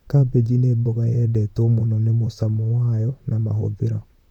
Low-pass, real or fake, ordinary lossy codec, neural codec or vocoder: 19.8 kHz; fake; none; vocoder, 44.1 kHz, 128 mel bands, Pupu-Vocoder